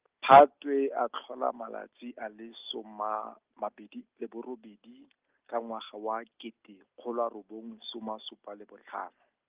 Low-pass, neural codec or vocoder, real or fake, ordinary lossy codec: 3.6 kHz; none; real; Opus, 24 kbps